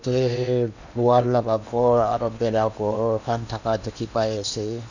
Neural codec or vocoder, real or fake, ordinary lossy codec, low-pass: codec, 16 kHz in and 24 kHz out, 0.8 kbps, FocalCodec, streaming, 65536 codes; fake; none; 7.2 kHz